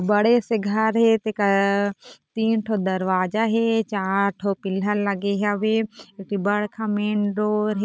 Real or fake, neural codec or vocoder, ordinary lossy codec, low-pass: real; none; none; none